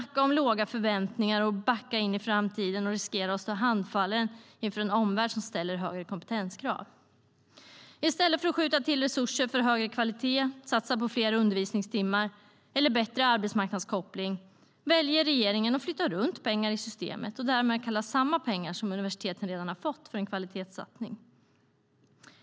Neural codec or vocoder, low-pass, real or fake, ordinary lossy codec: none; none; real; none